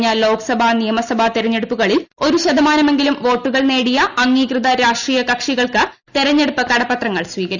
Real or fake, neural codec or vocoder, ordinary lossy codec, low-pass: real; none; none; 7.2 kHz